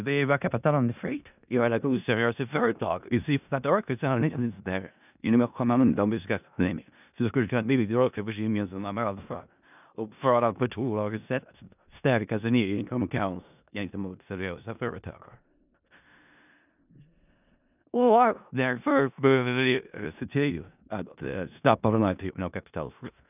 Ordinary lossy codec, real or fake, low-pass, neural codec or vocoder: none; fake; 3.6 kHz; codec, 16 kHz in and 24 kHz out, 0.4 kbps, LongCat-Audio-Codec, four codebook decoder